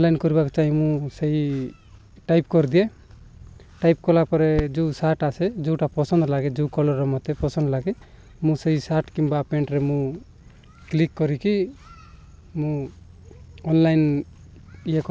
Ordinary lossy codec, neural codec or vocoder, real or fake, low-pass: none; none; real; none